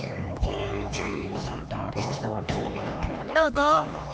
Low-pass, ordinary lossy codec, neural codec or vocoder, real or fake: none; none; codec, 16 kHz, 2 kbps, X-Codec, HuBERT features, trained on LibriSpeech; fake